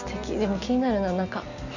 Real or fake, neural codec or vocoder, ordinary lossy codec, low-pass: real; none; none; 7.2 kHz